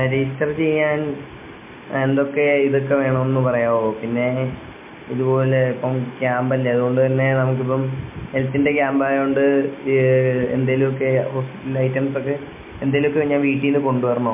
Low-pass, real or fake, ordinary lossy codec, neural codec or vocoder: 3.6 kHz; real; MP3, 24 kbps; none